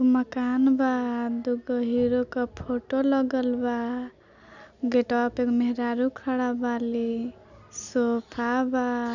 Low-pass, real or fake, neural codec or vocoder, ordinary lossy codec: 7.2 kHz; real; none; none